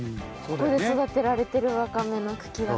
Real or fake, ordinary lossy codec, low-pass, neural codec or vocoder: real; none; none; none